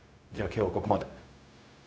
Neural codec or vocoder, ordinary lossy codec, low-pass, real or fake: codec, 16 kHz, 0.4 kbps, LongCat-Audio-Codec; none; none; fake